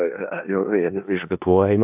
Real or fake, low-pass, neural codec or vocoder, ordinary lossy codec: fake; 3.6 kHz; codec, 16 kHz in and 24 kHz out, 0.4 kbps, LongCat-Audio-Codec, four codebook decoder; AAC, 32 kbps